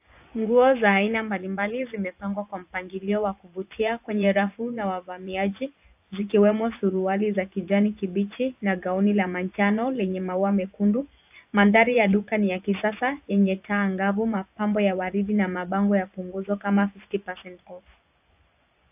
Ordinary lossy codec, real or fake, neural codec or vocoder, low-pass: AAC, 32 kbps; fake; vocoder, 24 kHz, 100 mel bands, Vocos; 3.6 kHz